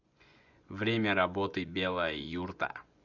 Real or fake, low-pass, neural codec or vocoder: fake; 7.2 kHz; vocoder, 24 kHz, 100 mel bands, Vocos